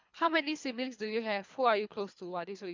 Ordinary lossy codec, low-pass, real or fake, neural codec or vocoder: MP3, 64 kbps; 7.2 kHz; fake; codec, 24 kHz, 3 kbps, HILCodec